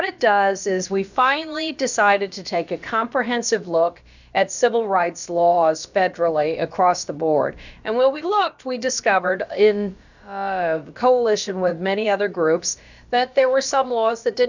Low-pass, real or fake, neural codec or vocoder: 7.2 kHz; fake; codec, 16 kHz, about 1 kbps, DyCAST, with the encoder's durations